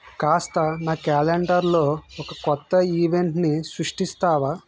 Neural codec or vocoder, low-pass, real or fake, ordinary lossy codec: none; none; real; none